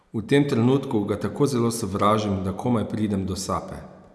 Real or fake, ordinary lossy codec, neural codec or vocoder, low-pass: real; none; none; none